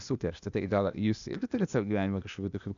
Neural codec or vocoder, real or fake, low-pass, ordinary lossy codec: codec, 16 kHz, 0.8 kbps, ZipCodec; fake; 7.2 kHz; MP3, 96 kbps